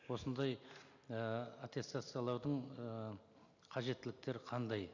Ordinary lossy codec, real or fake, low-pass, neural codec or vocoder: none; real; 7.2 kHz; none